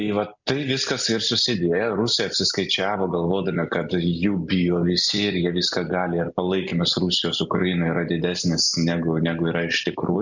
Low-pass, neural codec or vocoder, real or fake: 7.2 kHz; none; real